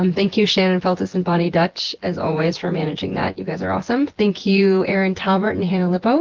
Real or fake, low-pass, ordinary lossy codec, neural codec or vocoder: fake; 7.2 kHz; Opus, 16 kbps; vocoder, 24 kHz, 100 mel bands, Vocos